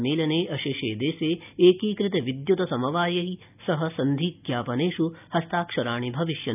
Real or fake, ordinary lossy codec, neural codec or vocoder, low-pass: real; none; none; 3.6 kHz